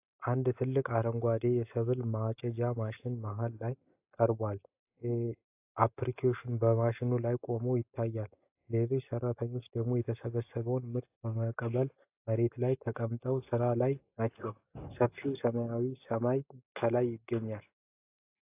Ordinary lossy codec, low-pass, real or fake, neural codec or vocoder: AAC, 32 kbps; 3.6 kHz; real; none